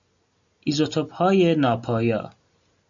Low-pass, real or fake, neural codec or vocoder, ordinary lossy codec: 7.2 kHz; real; none; MP3, 64 kbps